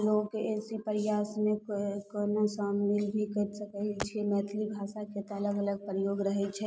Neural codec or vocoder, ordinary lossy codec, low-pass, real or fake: none; none; none; real